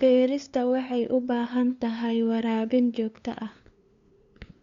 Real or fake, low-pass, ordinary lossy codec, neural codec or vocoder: fake; 7.2 kHz; Opus, 64 kbps; codec, 16 kHz, 2 kbps, FunCodec, trained on LibriTTS, 25 frames a second